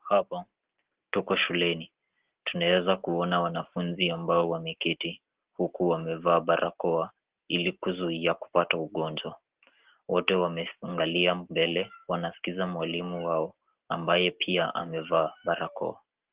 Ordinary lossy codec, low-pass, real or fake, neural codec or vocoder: Opus, 16 kbps; 3.6 kHz; real; none